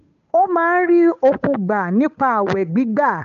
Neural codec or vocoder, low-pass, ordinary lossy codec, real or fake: codec, 16 kHz, 8 kbps, FunCodec, trained on Chinese and English, 25 frames a second; 7.2 kHz; AAC, 96 kbps; fake